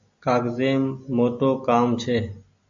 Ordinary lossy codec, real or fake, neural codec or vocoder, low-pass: AAC, 64 kbps; real; none; 7.2 kHz